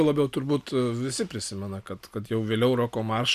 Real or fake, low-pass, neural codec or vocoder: real; 14.4 kHz; none